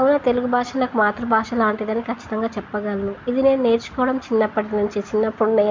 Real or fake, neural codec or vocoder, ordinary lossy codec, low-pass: real; none; MP3, 48 kbps; 7.2 kHz